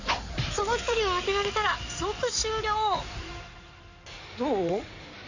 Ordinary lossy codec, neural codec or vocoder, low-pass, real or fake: none; codec, 16 kHz in and 24 kHz out, 2.2 kbps, FireRedTTS-2 codec; 7.2 kHz; fake